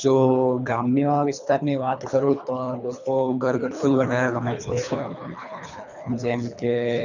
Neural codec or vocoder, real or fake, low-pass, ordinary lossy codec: codec, 24 kHz, 3 kbps, HILCodec; fake; 7.2 kHz; none